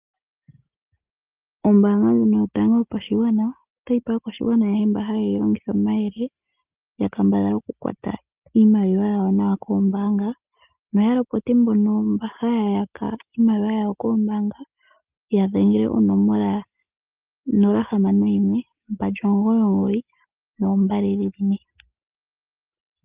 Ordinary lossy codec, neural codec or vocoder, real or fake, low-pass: Opus, 24 kbps; none; real; 3.6 kHz